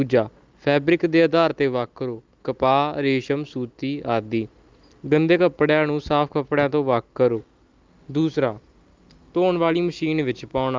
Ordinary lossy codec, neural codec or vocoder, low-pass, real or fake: Opus, 24 kbps; none; 7.2 kHz; real